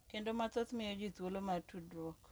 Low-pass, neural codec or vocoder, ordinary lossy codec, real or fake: none; none; none; real